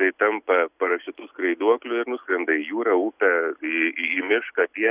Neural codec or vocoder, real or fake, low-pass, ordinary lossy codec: none; real; 3.6 kHz; Opus, 64 kbps